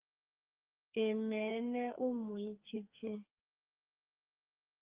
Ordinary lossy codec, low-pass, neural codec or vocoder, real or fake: Opus, 32 kbps; 3.6 kHz; codec, 44.1 kHz, 2.6 kbps, SNAC; fake